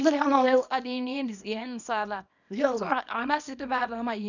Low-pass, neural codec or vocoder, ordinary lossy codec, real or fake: 7.2 kHz; codec, 24 kHz, 0.9 kbps, WavTokenizer, small release; none; fake